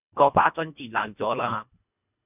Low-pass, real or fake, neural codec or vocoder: 3.6 kHz; fake; codec, 24 kHz, 1.5 kbps, HILCodec